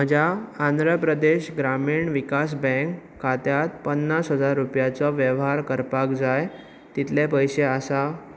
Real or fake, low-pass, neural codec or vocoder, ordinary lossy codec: real; none; none; none